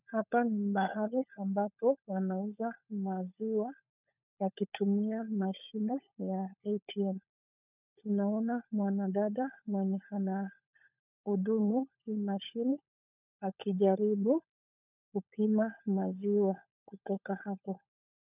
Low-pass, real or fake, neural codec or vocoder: 3.6 kHz; fake; codec, 16 kHz, 16 kbps, FunCodec, trained on LibriTTS, 50 frames a second